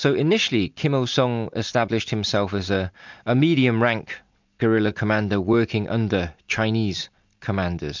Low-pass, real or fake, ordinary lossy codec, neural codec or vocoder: 7.2 kHz; real; MP3, 64 kbps; none